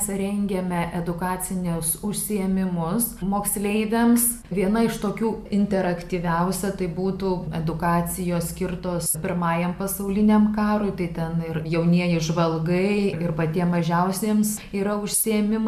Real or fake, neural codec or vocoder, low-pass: real; none; 14.4 kHz